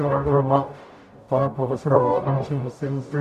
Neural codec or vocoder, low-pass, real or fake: codec, 44.1 kHz, 0.9 kbps, DAC; 14.4 kHz; fake